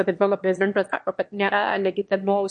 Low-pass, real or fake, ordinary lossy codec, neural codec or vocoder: 9.9 kHz; fake; MP3, 48 kbps; autoencoder, 22.05 kHz, a latent of 192 numbers a frame, VITS, trained on one speaker